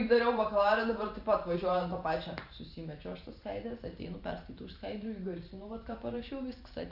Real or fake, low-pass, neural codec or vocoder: fake; 5.4 kHz; vocoder, 44.1 kHz, 128 mel bands every 512 samples, BigVGAN v2